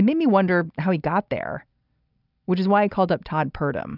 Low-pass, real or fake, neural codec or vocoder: 5.4 kHz; real; none